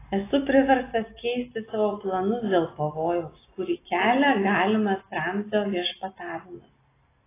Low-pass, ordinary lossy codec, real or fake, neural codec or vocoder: 3.6 kHz; AAC, 16 kbps; real; none